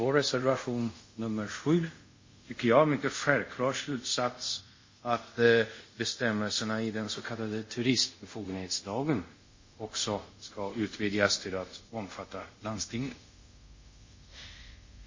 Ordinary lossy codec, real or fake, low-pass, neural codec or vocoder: MP3, 32 kbps; fake; 7.2 kHz; codec, 24 kHz, 0.5 kbps, DualCodec